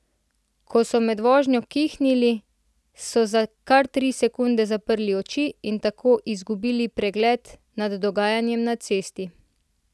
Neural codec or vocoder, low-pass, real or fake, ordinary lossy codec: none; none; real; none